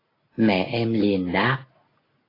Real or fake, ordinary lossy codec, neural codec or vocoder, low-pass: real; AAC, 24 kbps; none; 5.4 kHz